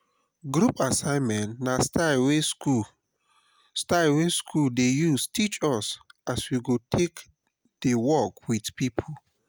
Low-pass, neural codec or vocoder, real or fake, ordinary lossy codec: none; none; real; none